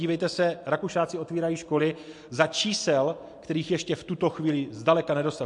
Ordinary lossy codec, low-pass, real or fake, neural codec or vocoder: MP3, 64 kbps; 10.8 kHz; real; none